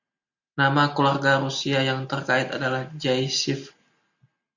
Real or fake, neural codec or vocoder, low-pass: real; none; 7.2 kHz